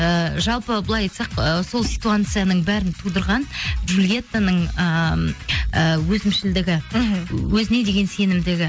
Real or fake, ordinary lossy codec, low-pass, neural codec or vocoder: real; none; none; none